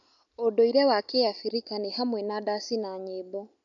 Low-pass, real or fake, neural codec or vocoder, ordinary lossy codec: 7.2 kHz; real; none; none